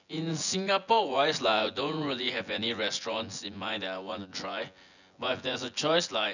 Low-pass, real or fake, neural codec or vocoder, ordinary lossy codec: 7.2 kHz; fake; vocoder, 24 kHz, 100 mel bands, Vocos; none